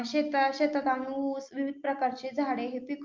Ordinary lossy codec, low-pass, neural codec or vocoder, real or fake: Opus, 24 kbps; 7.2 kHz; none; real